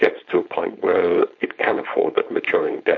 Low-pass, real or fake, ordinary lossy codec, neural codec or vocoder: 7.2 kHz; fake; MP3, 48 kbps; codec, 16 kHz, 4.8 kbps, FACodec